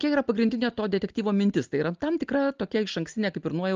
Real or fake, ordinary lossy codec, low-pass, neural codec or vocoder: real; Opus, 24 kbps; 7.2 kHz; none